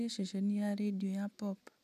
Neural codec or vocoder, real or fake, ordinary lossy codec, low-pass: none; real; none; 14.4 kHz